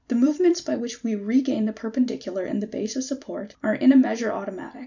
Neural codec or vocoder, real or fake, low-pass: vocoder, 44.1 kHz, 128 mel bands every 256 samples, BigVGAN v2; fake; 7.2 kHz